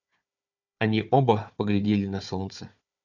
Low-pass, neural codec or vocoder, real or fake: 7.2 kHz; codec, 16 kHz, 4 kbps, FunCodec, trained on Chinese and English, 50 frames a second; fake